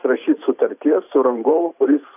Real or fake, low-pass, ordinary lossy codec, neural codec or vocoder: fake; 3.6 kHz; AAC, 32 kbps; vocoder, 44.1 kHz, 128 mel bands every 512 samples, BigVGAN v2